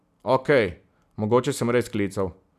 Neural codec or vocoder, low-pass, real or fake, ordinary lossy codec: none; 14.4 kHz; real; none